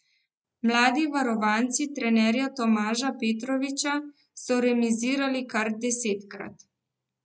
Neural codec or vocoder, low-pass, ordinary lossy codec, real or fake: none; none; none; real